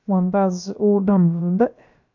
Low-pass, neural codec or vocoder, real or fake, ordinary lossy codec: 7.2 kHz; codec, 16 kHz, about 1 kbps, DyCAST, with the encoder's durations; fake; none